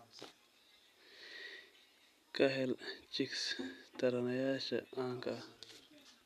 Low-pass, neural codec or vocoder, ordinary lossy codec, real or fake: 14.4 kHz; none; none; real